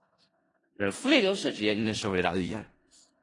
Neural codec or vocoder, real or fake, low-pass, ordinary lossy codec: codec, 16 kHz in and 24 kHz out, 0.4 kbps, LongCat-Audio-Codec, four codebook decoder; fake; 10.8 kHz; AAC, 32 kbps